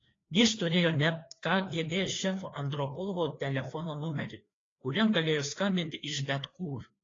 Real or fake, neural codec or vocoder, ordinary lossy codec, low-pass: fake; codec, 16 kHz, 2 kbps, FreqCodec, larger model; AAC, 32 kbps; 7.2 kHz